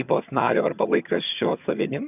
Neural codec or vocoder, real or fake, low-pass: vocoder, 22.05 kHz, 80 mel bands, HiFi-GAN; fake; 3.6 kHz